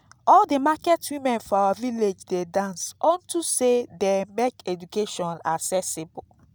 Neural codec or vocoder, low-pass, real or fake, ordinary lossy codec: none; none; real; none